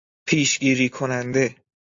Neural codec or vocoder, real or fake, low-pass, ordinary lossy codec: none; real; 7.2 kHz; AAC, 48 kbps